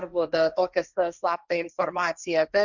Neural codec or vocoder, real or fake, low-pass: codec, 16 kHz, 1.1 kbps, Voila-Tokenizer; fake; 7.2 kHz